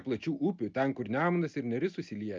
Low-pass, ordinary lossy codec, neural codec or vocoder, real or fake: 7.2 kHz; Opus, 24 kbps; none; real